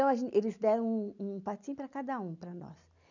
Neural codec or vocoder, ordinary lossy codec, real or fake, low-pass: none; none; real; 7.2 kHz